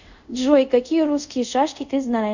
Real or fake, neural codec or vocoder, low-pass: fake; codec, 24 kHz, 0.5 kbps, DualCodec; 7.2 kHz